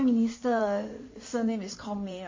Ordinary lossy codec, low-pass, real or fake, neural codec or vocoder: MP3, 32 kbps; 7.2 kHz; fake; codec, 16 kHz in and 24 kHz out, 2.2 kbps, FireRedTTS-2 codec